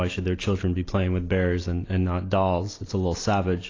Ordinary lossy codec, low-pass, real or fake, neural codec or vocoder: AAC, 32 kbps; 7.2 kHz; real; none